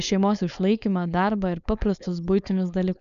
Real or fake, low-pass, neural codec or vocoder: fake; 7.2 kHz; codec, 16 kHz, 4.8 kbps, FACodec